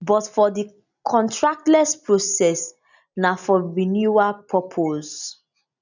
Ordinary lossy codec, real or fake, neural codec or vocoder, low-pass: none; real; none; 7.2 kHz